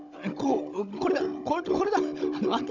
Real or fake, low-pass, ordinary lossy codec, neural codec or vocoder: fake; 7.2 kHz; none; codec, 16 kHz, 16 kbps, FunCodec, trained on Chinese and English, 50 frames a second